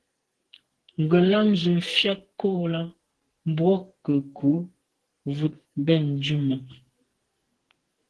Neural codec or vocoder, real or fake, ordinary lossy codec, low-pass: codec, 44.1 kHz, 2.6 kbps, SNAC; fake; Opus, 16 kbps; 10.8 kHz